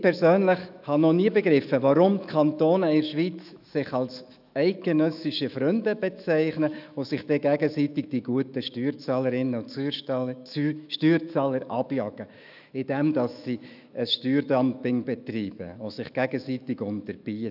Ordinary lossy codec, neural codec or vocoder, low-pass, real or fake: none; autoencoder, 48 kHz, 128 numbers a frame, DAC-VAE, trained on Japanese speech; 5.4 kHz; fake